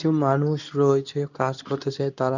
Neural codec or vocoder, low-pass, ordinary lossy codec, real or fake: codec, 24 kHz, 0.9 kbps, WavTokenizer, medium speech release version 2; 7.2 kHz; none; fake